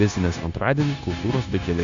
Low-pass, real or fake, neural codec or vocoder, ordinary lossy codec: 7.2 kHz; fake; codec, 16 kHz, 0.9 kbps, LongCat-Audio-Codec; AAC, 64 kbps